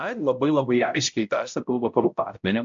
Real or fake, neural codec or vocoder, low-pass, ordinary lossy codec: fake; codec, 16 kHz, 0.5 kbps, X-Codec, HuBERT features, trained on balanced general audio; 7.2 kHz; AAC, 64 kbps